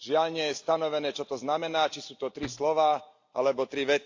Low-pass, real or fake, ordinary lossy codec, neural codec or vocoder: 7.2 kHz; real; AAC, 48 kbps; none